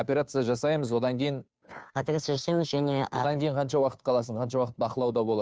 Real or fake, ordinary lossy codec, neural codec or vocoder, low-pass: fake; none; codec, 16 kHz, 2 kbps, FunCodec, trained on Chinese and English, 25 frames a second; none